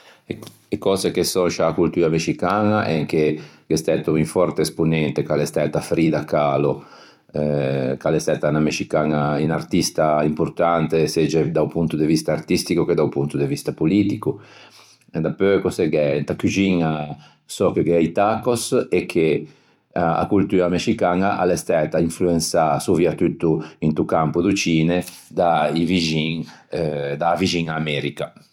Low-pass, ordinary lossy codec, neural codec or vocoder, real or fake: 19.8 kHz; none; vocoder, 44.1 kHz, 128 mel bands every 256 samples, BigVGAN v2; fake